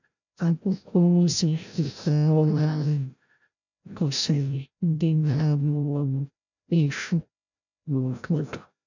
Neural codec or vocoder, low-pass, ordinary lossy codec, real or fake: codec, 16 kHz, 0.5 kbps, FreqCodec, larger model; 7.2 kHz; none; fake